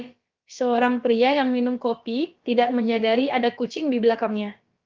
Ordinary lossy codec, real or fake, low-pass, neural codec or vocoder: Opus, 24 kbps; fake; 7.2 kHz; codec, 16 kHz, about 1 kbps, DyCAST, with the encoder's durations